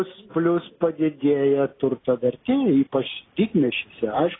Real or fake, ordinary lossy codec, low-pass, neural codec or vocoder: real; AAC, 16 kbps; 7.2 kHz; none